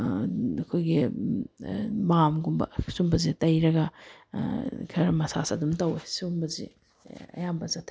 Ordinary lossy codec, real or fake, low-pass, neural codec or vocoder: none; real; none; none